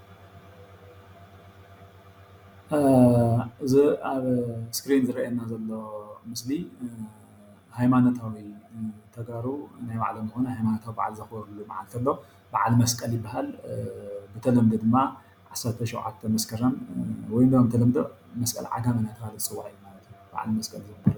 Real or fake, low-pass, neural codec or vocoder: real; 19.8 kHz; none